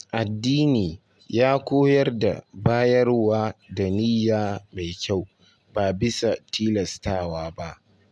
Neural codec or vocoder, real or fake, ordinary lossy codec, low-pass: none; real; none; none